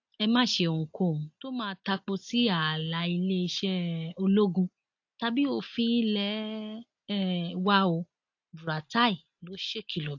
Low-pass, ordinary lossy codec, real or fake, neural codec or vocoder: 7.2 kHz; AAC, 48 kbps; real; none